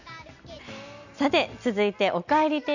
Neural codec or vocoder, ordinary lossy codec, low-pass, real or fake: none; none; 7.2 kHz; real